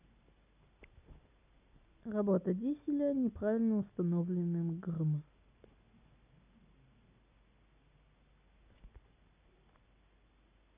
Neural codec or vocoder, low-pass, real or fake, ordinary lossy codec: none; 3.6 kHz; real; none